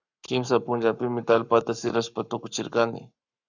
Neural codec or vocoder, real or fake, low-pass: codec, 44.1 kHz, 7.8 kbps, Pupu-Codec; fake; 7.2 kHz